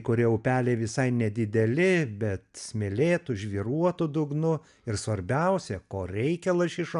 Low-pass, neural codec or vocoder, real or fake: 10.8 kHz; none; real